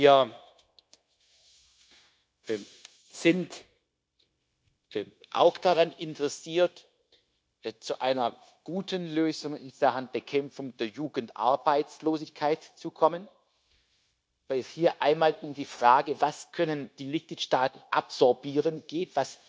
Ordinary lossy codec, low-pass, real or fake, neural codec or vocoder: none; none; fake; codec, 16 kHz, 0.9 kbps, LongCat-Audio-Codec